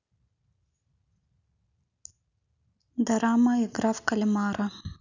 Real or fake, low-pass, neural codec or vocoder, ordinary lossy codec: real; 7.2 kHz; none; none